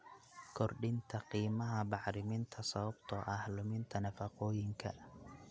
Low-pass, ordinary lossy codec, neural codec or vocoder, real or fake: none; none; none; real